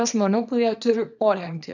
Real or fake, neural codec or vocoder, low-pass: fake; codec, 24 kHz, 0.9 kbps, WavTokenizer, small release; 7.2 kHz